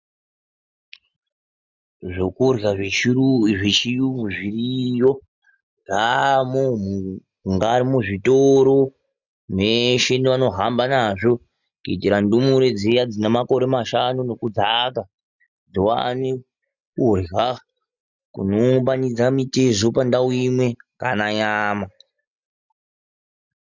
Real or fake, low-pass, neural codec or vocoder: real; 7.2 kHz; none